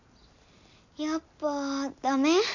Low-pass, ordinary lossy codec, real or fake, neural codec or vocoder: 7.2 kHz; none; real; none